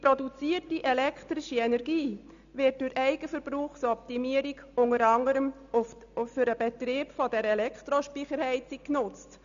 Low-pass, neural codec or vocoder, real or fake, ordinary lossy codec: 7.2 kHz; none; real; MP3, 96 kbps